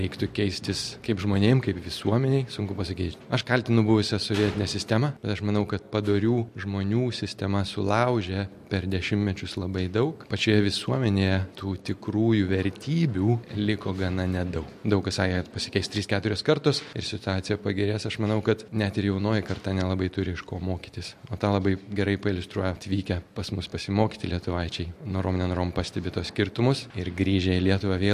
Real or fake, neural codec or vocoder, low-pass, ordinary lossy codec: real; none; 14.4 kHz; MP3, 64 kbps